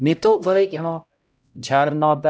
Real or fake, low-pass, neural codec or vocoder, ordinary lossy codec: fake; none; codec, 16 kHz, 0.5 kbps, X-Codec, HuBERT features, trained on LibriSpeech; none